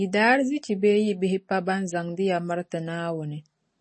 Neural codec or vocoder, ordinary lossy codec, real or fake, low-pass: none; MP3, 32 kbps; real; 10.8 kHz